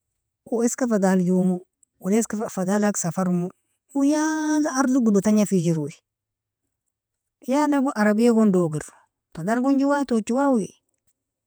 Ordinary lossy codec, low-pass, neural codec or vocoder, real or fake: none; none; vocoder, 48 kHz, 128 mel bands, Vocos; fake